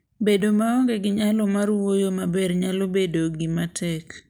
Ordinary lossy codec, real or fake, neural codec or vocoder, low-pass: none; real; none; none